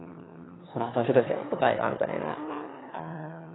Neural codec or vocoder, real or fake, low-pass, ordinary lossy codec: autoencoder, 22.05 kHz, a latent of 192 numbers a frame, VITS, trained on one speaker; fake; 7.2 kHz; AAC, 16 kbps